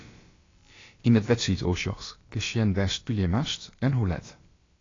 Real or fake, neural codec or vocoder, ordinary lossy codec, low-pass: fake; codec, 16 kHz, about 1 kbps, DyCAST, with the encoder's durations; AAC, 32 kbps; 7.2 kHz